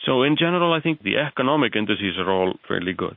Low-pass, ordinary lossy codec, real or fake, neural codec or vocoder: 5.4 kHz; MP3, 32 kbps; real; none